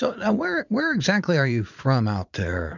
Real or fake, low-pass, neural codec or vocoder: fake; 7.2 kHz; vocoder, 44.1 kHz, 80 mel bands, Vocos